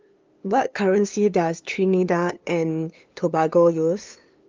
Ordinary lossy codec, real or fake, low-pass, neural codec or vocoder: Opus, 24 kbps; fake; 7.2 kHz; codec, 16 kHz, 2 kbps, FunCodec, trained on LibriTTS, 25 frames a second